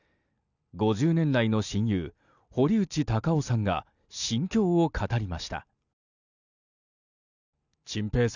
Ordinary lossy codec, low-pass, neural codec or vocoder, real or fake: none; 7.2 kHz; none; real